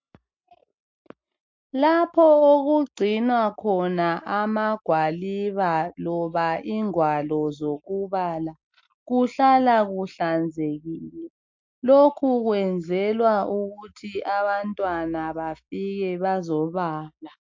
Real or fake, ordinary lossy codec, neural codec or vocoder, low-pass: real; MP3, 48 kbps; none; 7.2 kHz